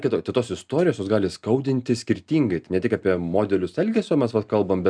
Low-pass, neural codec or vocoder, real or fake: 9.9 kHz; none; real